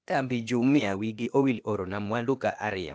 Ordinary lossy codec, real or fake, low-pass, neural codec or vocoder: none; fake; none; codec, 16 kHz, 0.8 kbps, ZipCodec